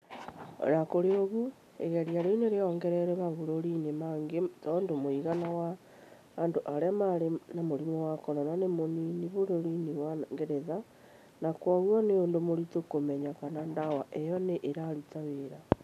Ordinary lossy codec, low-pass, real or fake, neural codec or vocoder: none; 14.4 kHz; real; none